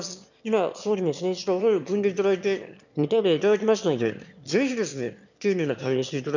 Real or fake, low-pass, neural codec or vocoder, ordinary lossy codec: fake; 7.2 kHz; autoencoder, 22.05 kHz, a latent of 192 numbers a frame, VITS, trained on one speaker; none